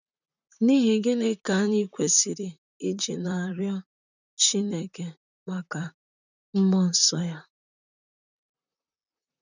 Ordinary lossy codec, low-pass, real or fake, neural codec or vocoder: none; 7.2 kHz; fake; vocoder, 44.1 kHz, 128 mel bands, Pupu-Vocoder